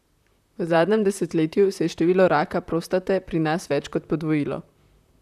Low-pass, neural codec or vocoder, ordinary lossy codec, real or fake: 14.4 kHz; vocoder, 44.1 kHz, 128 mel bands, Pupu-Vocoder; none; fake